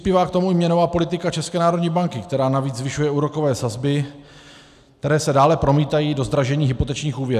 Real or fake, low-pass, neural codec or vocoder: real; 14.4 kHz; none